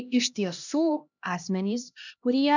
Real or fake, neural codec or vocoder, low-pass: fake; codec, 16 kHz, 1 kbps, X-Codec, HuBERT features, trained on LibriSpeech; 7.2 kHz